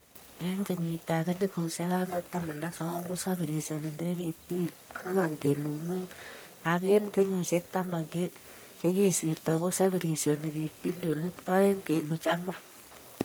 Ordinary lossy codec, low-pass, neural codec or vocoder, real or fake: none; none; codec, 44.1 kHz, 1.7 kbps, Pupu-Codec; fake